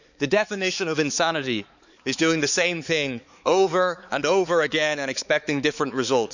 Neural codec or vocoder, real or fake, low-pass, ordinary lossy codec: codec, 16 kHz, 4 kbps, X-Codec, HuBERT features, trained on balanced general audio; fake; 7.2 kHz; none